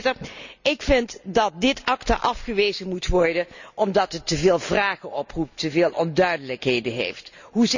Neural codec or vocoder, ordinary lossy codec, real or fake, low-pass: none; none; real; 7.2 kHz